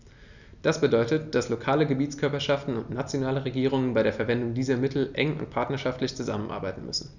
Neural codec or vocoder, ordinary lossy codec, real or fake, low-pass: none; none; real; 7.2 kHz